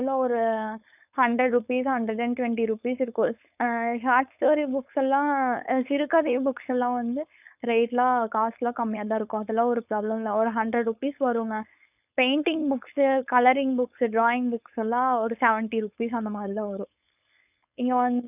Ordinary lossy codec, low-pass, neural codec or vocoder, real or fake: none; 3.6 kHz; codec, 16 kHz, 4.8 kbps, FACodec; fake